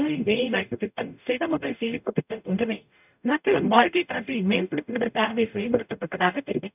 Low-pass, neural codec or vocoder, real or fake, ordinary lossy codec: 3.6 kHz; codec, 44.1 kHz, 0.9 kbps, DAC; fake; none